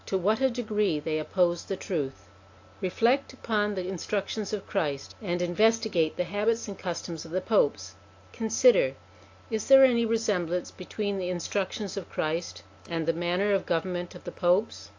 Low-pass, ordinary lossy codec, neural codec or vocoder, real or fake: 7.2 kHz; AAC, 48 kbps; none; real